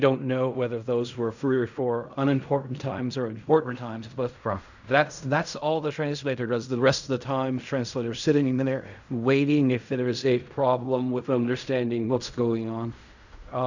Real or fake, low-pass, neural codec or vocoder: fake; 7.2 kHz; codec, 16 kHz in and 24 kHz out, 0.4 kbps, LongCat-Audio-Codec, fine tuned four codebook decoder